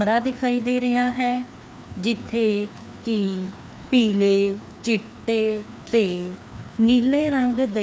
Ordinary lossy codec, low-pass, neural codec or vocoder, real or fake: none; none; codec, 16 kHz, 2 kbps, FreqCodec, larger model; fake